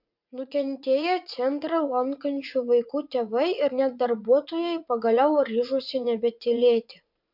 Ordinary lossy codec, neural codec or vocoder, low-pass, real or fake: MP3, 48 kbps; vocoder, 44.1 kHz, 80 mel bands, Vocos; 5.4 kHz; fake